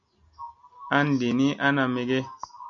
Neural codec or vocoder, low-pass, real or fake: none; 7.2 kHz; real